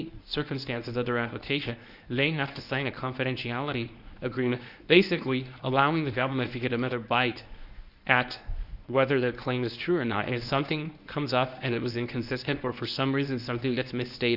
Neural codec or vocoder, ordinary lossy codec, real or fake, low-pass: codec, 24 kHz, 0.9 kbps, WavTokenizer, medium speech release version 1; Opus, 64 kbps; fake; 5.4 kHz